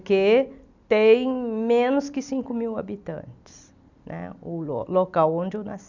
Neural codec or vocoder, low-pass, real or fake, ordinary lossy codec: none; 7.2 kHz; real; none